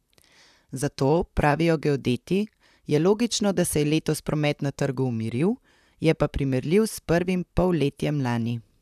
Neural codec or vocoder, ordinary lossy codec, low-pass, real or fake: vocoder, 44.1 kHz, 128 mel bands, Pupu-Vocoder; none; 14.4 kHz; fake